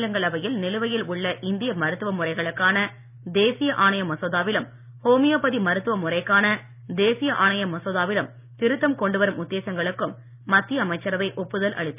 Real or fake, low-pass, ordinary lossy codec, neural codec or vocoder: real; 3.6 kHz; none; none